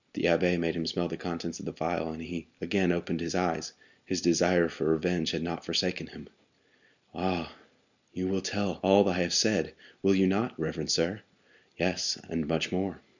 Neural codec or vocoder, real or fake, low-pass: none; real; 7.2 kHz